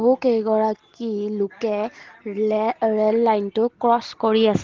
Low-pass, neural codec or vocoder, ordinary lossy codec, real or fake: 7.2 kHz; none; Opus, 16 kbps; real